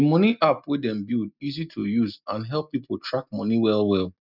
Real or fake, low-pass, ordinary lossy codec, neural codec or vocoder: real; 5.4 kHz; none; none